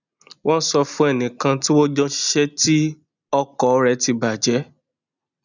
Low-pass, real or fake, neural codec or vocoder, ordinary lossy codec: 7.2 kHz; real; none; none